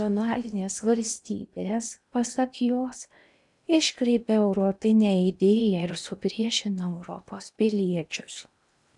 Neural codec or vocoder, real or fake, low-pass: codec, 16 kHz in and 24 kHz out, 0.8 kbps, FocalCodec, streaming, 65536 codes; fake; 10.8 kHz